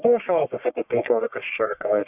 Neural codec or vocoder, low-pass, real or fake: codec, 44.1 kHz, 1.7 kbps, Pupu-Codec; 3.6 kHz; fake